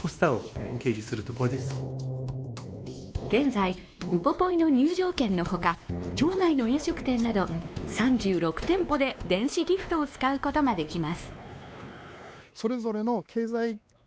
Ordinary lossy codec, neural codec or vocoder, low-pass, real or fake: none; codec, 16 kHz, 2 kbps, X-Codec, WavLM features, trained on Multilingual LibriSpeech; none; fake